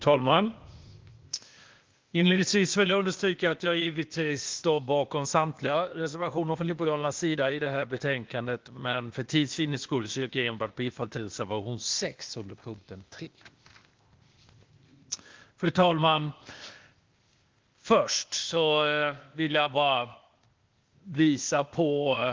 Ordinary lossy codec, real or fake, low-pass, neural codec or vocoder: Opus, 32 kbps; fake; 7.2 kHz; codec, 16 kHz, 0.8 kbps, ZipCodec